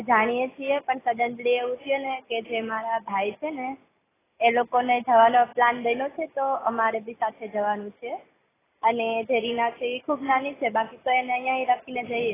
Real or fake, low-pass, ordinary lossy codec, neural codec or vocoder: real; 3.6 kHz; AAC, 16 kbps; none